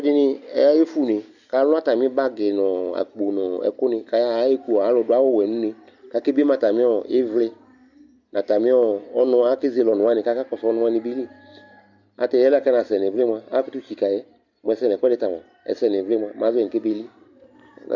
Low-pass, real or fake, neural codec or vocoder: 7.2 kHz; real; none